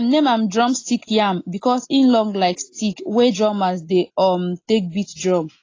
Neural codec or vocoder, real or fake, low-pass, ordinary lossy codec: none; real; 7.2 kHz; AAC, 32 kbps